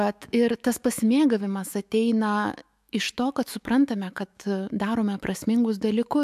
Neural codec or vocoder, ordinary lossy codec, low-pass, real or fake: autoencoder, 48 kHz, 128 numbers a frame, DAC-VAE, trained on Japanese speech; AAC, 96 kbps; 14.4 kHz; fake